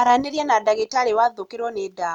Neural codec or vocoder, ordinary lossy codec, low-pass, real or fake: none; none; 19.8 kHz; real